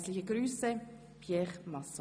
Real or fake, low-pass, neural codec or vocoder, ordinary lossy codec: real; none; none; none